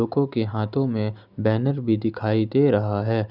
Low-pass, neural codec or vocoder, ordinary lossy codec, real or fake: 5.4 kHz; none; none; real